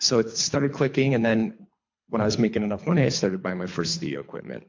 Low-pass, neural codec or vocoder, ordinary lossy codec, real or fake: 7.2 kHz; codec, 24 kHz, 3 kbps, HILCodec; MP3, 48 kbps; fake